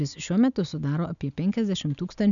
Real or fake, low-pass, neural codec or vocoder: real; 7.2 kHz; none